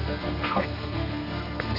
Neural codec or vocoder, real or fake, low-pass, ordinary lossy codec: codec, 32 kHz, 1.9 kbps, SNAC; fake; 5.4 kHz; none